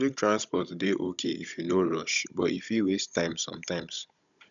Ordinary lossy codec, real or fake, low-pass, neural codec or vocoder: none; fake; 7.2 kHz; codec, 16 kHz, 16 kbps, FunCodec, trained on Chinese and English, 50 frames a second